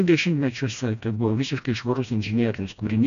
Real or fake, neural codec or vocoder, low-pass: fake; codec, 16 kHz, 1 kbps, FreqCodec, smaller model; 7.2 kHz